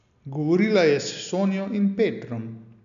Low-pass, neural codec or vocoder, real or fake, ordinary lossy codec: 7.2 kHz; none; real; AAC, 96 kbps